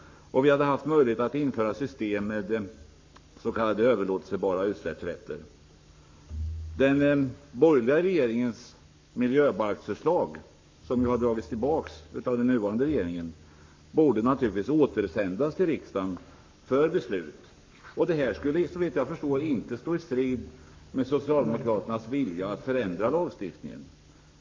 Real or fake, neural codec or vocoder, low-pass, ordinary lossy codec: fake; codec, 44.1 kHz, 7.8 kbps, Pupu-Codec; 7.2 kHz; MP3, 48 kbps